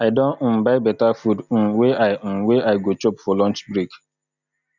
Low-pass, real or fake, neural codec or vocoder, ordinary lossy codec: 7.2 kHz; real; none; none